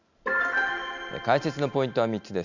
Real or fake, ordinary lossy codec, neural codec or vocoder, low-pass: real; none; none; 7.2 kHz